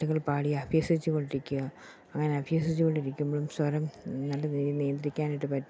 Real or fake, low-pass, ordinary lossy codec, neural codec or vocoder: real; none; none; none